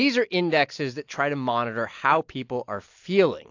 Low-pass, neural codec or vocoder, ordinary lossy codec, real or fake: 7.2 kHz; none; AAC, 48 kbps; real